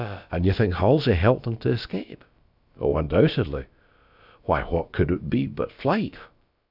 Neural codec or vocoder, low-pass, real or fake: codec, 16 kHz, about 1 kbps, DyCAST, with the encoder's durations; 5.4 kHz; fake